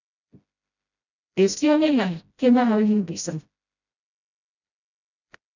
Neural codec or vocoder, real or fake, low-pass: codec, 16 kHz, 0.5 kbps, FreqCodec, smaller model; fake; 7.2 kHz